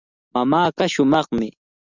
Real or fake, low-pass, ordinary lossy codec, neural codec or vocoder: real; 7.2 kHz; Opus, 64 kbps; none